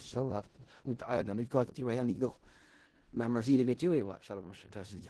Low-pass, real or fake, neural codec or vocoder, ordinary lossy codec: 10.8 kHz; fake; codec, 16 kHz in and 24 kHz out, 0.4 kbps, LongCat-Audio-Codec, four codebook decoder; Opus, 16 kbps